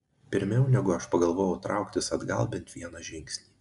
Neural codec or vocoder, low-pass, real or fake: none; 10.8 kHz; real